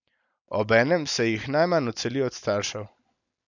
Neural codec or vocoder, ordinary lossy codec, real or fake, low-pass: none; none; real; 7.2 kHz